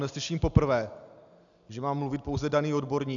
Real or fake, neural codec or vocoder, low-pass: real; none; 7.2 kHz